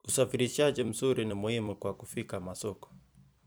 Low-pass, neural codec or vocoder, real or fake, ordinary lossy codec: none; vocoder, 44.1 kHz, 128 mel bands every 256 samples, BigVGAN v2; fake; none